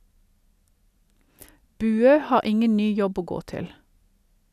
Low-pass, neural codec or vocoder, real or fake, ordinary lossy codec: 14.4 kHz; none; real; none